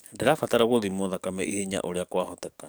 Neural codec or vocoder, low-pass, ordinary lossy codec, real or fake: codec, 44.1 kHz, 7.8 kbps, DAC; none; none; fake